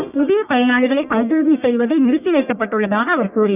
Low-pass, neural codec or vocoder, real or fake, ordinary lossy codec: 3.6 kHz; codec, 44.1 kHz, 1.7 kbps, Pupu-Codec; fake; none